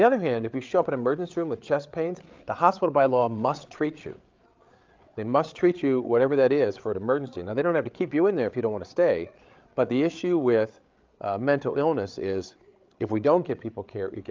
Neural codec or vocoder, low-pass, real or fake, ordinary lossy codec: codec, 16 kHz, 8 kbps, FreqCodec, larger model; 7.2 kHz; fake; Opus, 24 kbps